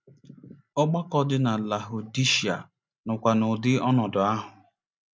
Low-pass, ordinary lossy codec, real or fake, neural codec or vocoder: none; none; real; none